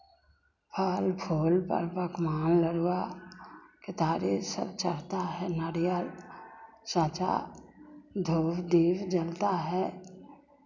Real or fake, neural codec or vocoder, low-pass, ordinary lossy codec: real; none; 7.2 kHz; none